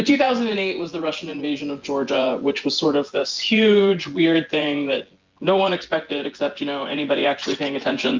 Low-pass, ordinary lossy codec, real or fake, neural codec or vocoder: 7.2 kHz; Opus, 32 kbps; fake; vocoder, 24 kHz, 100 mel bands, Vocos